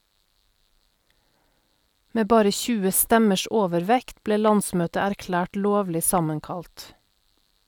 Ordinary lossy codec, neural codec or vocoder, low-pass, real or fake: none; none; 19.8 kHz; real